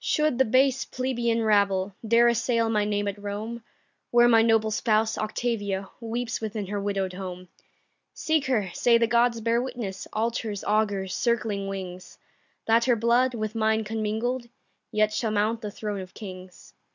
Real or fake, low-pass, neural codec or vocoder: real; 7.2 kHz; none